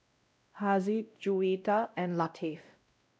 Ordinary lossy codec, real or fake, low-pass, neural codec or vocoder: none; fake; none; codec, 16 kHz, 0.5 kbps, X-Codec, WavLM features, trained on Multilingual LibriSpeech